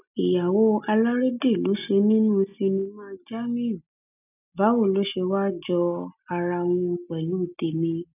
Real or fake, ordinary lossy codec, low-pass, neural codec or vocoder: real; none; 3.6 kHz; none